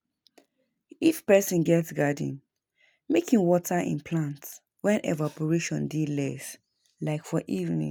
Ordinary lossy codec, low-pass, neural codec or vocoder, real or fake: none; none; none; real